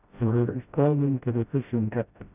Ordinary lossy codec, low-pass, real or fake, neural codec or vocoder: none; 3.6 kHz; fake; codec, 16 kHz, 0.5 kbps, FreqCodec, smaller model